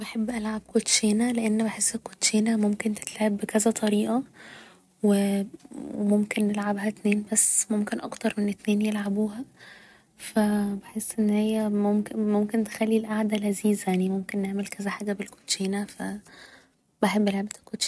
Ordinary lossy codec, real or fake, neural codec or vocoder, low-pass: none; real; none; none